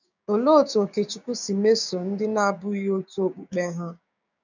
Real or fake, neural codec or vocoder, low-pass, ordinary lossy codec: fake; codec, 16 kHz, 6 kbps, DAC; 7.2 kHz; none